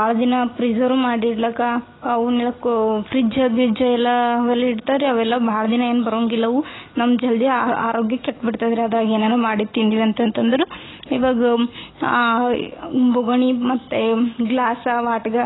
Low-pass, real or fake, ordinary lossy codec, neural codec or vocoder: 7.2 kHz; real; AAC, 16 kbps; none